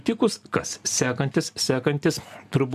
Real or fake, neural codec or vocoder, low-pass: real; none; 14.4 kHz